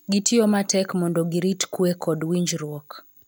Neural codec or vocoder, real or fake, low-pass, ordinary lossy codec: none; real; none; none